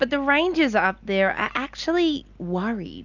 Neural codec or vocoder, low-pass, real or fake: none; 7.2 kHz; real